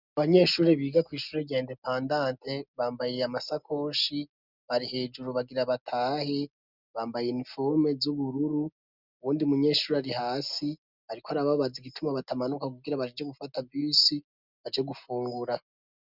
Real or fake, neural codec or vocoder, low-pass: real; none; 5.4 kHz